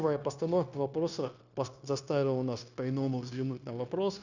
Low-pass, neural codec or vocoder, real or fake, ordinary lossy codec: 7.2 kHz; codec, 16 kHz, 0.9 kbps, LongCat-Audio-Codec; fake; none